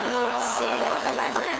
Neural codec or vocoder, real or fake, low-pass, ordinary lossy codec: codec, 16 kHz, 2 kbps, FunCodec, trained on LibriTTS, 25 frames a second; fake; none; none